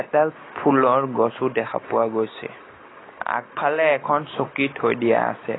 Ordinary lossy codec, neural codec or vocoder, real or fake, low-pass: AAC, 16 kbps; none; real; 7.2 kHz